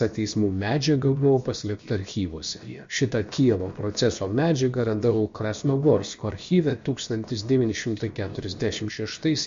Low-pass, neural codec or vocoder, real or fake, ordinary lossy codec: 7.2 kHz; codec, 16 kHz, about 1 kbps, DyCAST, with the encoder's durations; fake; MP3, 48 kbps